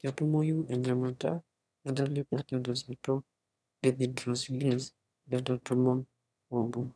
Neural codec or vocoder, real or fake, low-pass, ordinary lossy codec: autoencoder, 22.05 kHz, a latent of 192 numbers a frame, VITS, trained on one speaker; fake; none; none